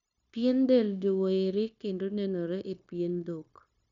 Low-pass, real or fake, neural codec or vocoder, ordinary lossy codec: 7.2 kHz; fake; codec, 16 kHz, 0.9 kbps, LongCat-Audio-Codec; none